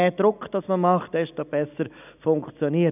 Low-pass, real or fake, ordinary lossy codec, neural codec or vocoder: 3.6 kHz; real; none; none